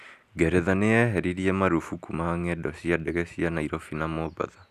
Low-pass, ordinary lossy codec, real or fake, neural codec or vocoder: 14.4 kHz; none; real; none